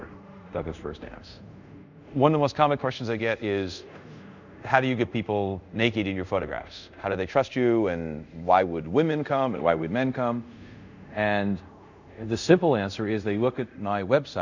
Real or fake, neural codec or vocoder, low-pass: fake; codec, 24 kHz, 0.5 kbps, DualCodec; 7.2 kHz